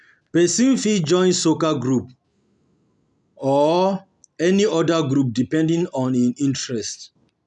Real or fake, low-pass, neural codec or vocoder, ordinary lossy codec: real; 10.8 kHz; none; none